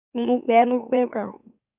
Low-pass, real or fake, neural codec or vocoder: 3.6 kHz; fake; autoencoder, 44.1 kHz, a latent of 192 numbers a frame, MeloTTS